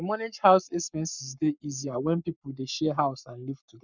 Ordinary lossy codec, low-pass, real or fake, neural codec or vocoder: none; 7.2 kHz; fake; codec, 44.1 kHz, 7.8 kbps, Pupu-Codec